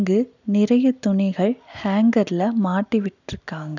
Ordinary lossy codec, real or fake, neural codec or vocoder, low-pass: none; real; none; 7.2 kHz